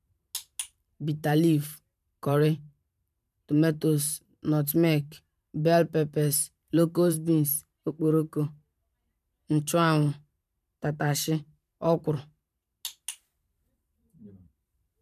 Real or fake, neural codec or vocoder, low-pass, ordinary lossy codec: fake; vocoder, 44.1 kHz, 128 mel bands every 512 samples, BigVGAN v2; 14.4 kHz; none